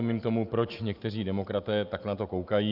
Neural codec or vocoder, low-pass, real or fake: none; 5.4 kHz; real